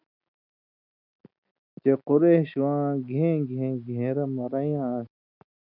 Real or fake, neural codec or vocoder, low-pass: real; none; 5.4 kHz